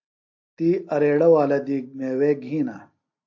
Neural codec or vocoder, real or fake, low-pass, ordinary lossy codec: none; real; 7.2 kHz; AAC, 48 kbps